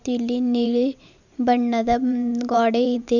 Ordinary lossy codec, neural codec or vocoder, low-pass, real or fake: none; vocoder, 44.1 kHz, 128 mel bands every 512 samples, BigVGAN v2; 7.2 kHz; fake